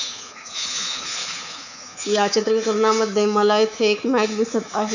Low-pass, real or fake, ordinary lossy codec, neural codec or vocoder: 7.2 kHz; fake; none; codec, 24 kHz, 3.1 kbps, DualCodec